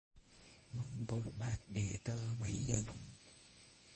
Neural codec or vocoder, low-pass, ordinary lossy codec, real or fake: codec, 24 kHz, 0.9 kbps, WavTokenizer, medium speech release version 1; 10.8 kHz; MP3, 32 kbps; fake